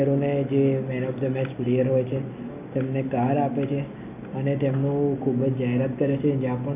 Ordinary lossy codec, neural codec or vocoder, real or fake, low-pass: MP3, 32 kbps; none; real; 3.6 kHz